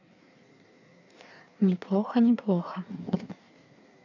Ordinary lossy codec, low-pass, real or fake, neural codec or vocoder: none; 7.2 kHz; fake; codec, 16 kHz in and 24 kHz out, 1.1 kbps, FireRedTTS-2 codec